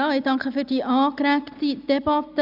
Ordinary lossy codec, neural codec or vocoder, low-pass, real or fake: none; none; 5.4 kHz; real